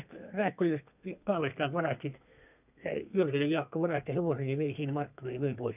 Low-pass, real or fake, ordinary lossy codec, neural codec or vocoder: 3.6 kHz; fake; none; codec, 32 kHz, 1.9 kbps, SNAC